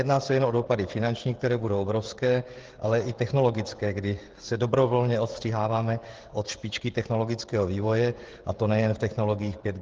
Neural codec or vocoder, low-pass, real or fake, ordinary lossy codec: codec, 16 kHz, 16 kbps, FreqCodec, smaller model; 7.2 kHz; fake; Opus, 16 kbps